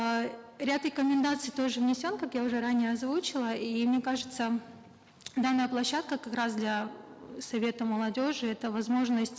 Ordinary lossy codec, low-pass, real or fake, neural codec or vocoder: none; none; real; none